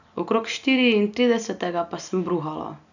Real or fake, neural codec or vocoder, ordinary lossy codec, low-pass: real; none; none; 7.2 kHz